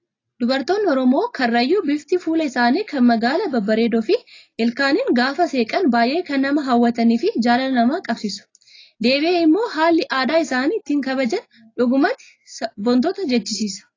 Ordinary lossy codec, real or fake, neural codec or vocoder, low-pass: AAC, 32 kbps; real; none; 7.2 kHz